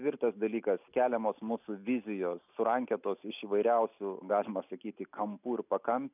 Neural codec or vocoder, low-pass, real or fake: none; 3.6 kHz; real